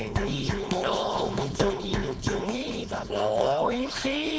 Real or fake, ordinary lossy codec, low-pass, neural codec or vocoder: fake; none; none; codec, 16 kHz, 4.8 kbps, FACodec